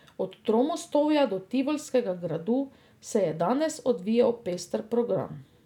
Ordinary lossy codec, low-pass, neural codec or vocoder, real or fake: none; 19.8 kHz; none; real